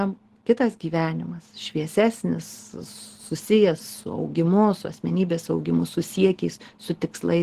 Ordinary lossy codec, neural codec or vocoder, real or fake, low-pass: Opus, 16 kbps; none; real; 14.4 kHz